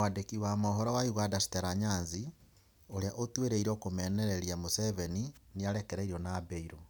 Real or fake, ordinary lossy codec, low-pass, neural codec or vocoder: real; none; none; none